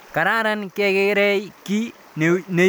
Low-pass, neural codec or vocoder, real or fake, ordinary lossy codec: none; none; real; none